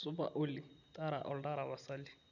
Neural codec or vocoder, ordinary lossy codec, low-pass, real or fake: none; none; 7.2 kHz; real